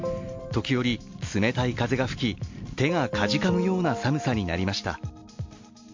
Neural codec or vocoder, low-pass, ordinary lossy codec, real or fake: none; 7.2 kHz; MP3, 48 kbps; real